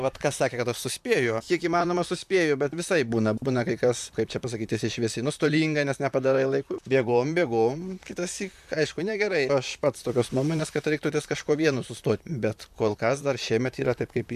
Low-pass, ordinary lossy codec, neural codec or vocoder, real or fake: 14.4 kHz; MP3, 96 kbps; vocoder, 44.1 kHz, 128 mel bands, Pupu-Vocoder; fake